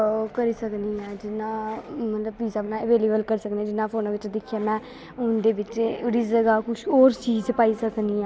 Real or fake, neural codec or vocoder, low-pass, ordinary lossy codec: real; none; none; none